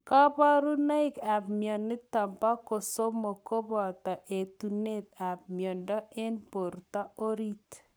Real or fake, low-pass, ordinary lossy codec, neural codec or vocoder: fake; none; none; codec, 44.1 kHz, 7.8 kbps, Pupu-Codec